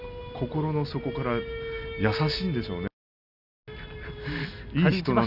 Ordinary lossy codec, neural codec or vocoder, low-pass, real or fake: none; none; 5.4 kHz; real